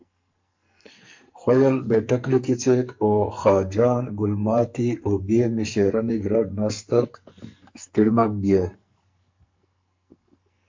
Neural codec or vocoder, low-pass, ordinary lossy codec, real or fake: codec, 44.1 kHz, 2.6 kbps, SNAC; 7.2 kHz; MP3, 48 kbps; fake